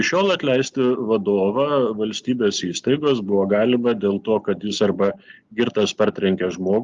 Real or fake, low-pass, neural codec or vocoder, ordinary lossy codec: real; 7.2 kHz; none; Opus, 32 kbps